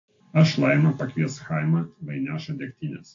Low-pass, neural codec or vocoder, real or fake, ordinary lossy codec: 7.2 kHz; none; real; AAC, 48 kbps